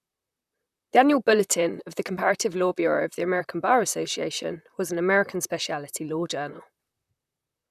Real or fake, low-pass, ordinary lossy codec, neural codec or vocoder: fake; 14.4 kHz; none; vocoder, 44.1 kHz, 128 mel bands, Pupu-Vocoder